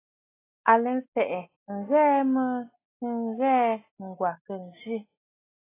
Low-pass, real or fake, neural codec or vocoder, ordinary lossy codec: 3.6 kHz; real; none; AAC, 16 kbps